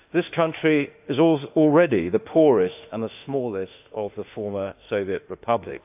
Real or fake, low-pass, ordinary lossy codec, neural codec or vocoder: fake; 3.6 kHz; AAC, 32 kbps; autoencoder, 48 kHz, 32 numbers a frame, DAC-VAE, trained on Japanese speech